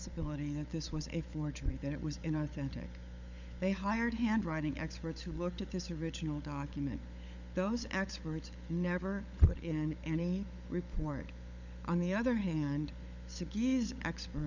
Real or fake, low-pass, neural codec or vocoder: fake; 7.2 kHz; codec, 16 kHz, 16 kbps, FunCodec, trained on LibriTTS, 50 frames a second